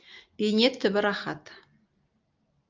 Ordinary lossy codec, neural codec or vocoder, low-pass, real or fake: Opus, 24 kbps; none; 7.2 kHz; real